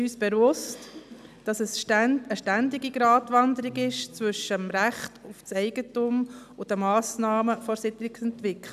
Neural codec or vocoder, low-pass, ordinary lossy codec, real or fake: none; 14.4 kHz; none; real